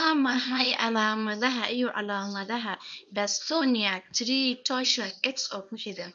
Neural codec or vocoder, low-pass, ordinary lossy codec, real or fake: codec, 24 kHz, 0.9 kbps, WavTokenizer, small release; 9.9 kHz; MP3, 96 kbps; fake